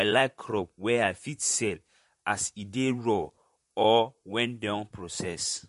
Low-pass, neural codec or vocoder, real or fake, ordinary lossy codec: 14.4 kHz; vocoder, 44.1 kHz, 128 mel bands, Pupu-Vocoder; fake; MP3, 48 kbps